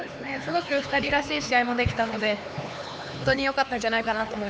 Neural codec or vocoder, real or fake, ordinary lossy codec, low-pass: codec, 16 kHz, 4 kbps, X-Codec, HuBERT features, trained on LibriSpeech; fake; none; none